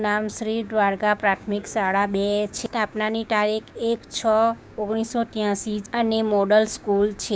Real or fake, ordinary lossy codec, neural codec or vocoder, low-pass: fake; none; codec, 16 kHz, 6 kbps, DAC; none